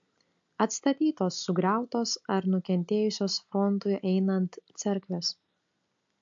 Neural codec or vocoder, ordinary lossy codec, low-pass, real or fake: none; AAC, 64 kbps; 7.2 kHz; real